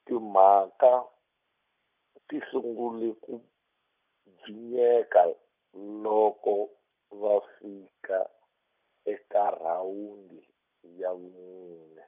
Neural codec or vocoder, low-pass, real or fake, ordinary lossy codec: none; 3.6 kHz; real; none